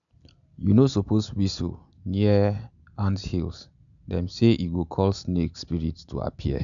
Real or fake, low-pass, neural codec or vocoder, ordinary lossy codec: real; 7.2 kHz; none; none